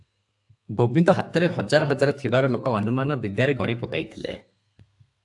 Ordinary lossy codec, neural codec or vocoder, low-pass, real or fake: AAC, 64 kbps; codec, 32 kHz, 1.9 kbps, SNAC; 10.8 kHz; fake